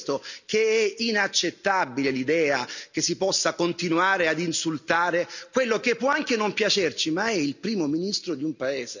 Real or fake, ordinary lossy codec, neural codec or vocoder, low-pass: real; none; none; 7.2 kHz